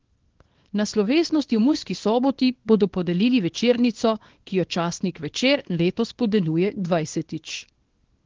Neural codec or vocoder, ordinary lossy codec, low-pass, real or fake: codec, 24 kHz, 0.9 kbps, WavTokenizer, small release; Opus, 16 kbps; 7.2 kHz; fake